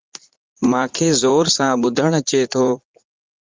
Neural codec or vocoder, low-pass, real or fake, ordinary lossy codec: vocoder, 44.1 kHz, 80 mel bands, Vocos; 7.2 kHz; fake; Opus, 32 kbps